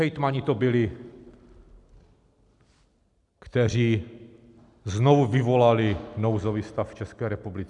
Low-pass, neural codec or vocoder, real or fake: 10.8 kHz; none; real